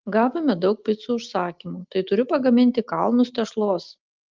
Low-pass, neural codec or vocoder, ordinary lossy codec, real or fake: 7.2 kHz; none; Opus, 24 kbps; real